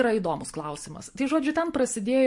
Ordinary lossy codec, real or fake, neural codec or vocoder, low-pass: MP3, 48 kbps; real; none; 10.8 kHz